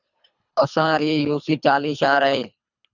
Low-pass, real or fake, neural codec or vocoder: 7.2 kHz; fake; codec, 24 kHz, 3 kbps, HILCodec